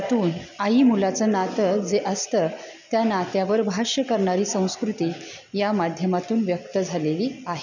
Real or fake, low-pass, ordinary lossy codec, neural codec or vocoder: real; 7.2 kHz; none; none